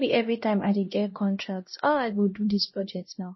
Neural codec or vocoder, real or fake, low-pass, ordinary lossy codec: codec, 16 kHz, 1 kbps, X-Codec, HuBERT features, trained on LibriSpeech; fake; 7.2 kHz; MP3, 24 kbps